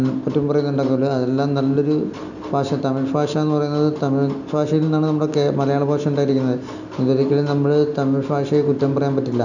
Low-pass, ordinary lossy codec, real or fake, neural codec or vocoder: 7.2 kHz; none; real; none